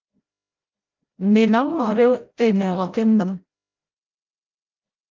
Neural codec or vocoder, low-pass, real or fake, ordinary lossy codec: codec, 16 kHz, 0.5 kbps, FreqCodec, larger model; 7.2 kHz; fake; Opus, 32 kbps